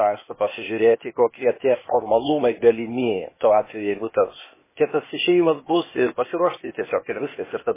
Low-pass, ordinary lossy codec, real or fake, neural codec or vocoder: 3.6 kHz; MP3, 16 kbps; fake; codec, 16 kHz, 0.8 kbps, ZipCodec